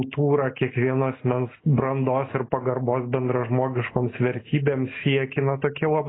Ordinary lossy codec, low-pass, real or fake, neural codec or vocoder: AAC, 16 kbps; 7.2 kHz; real; none